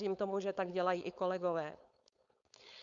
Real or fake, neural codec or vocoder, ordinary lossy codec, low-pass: fake; codec, 16 kHz, 4.8 kbps, FACodec; Opus, 64 kbps; 7.2 kHz